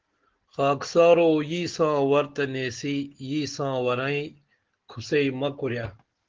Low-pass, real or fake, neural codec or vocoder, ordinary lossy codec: 7.2 kHz; real; none; Opus, 16 kbps